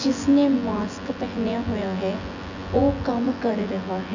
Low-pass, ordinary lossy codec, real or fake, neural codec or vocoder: 7.2 kHz; none; fake; vocoder, 24 kHz, 100 mel bands, Vocos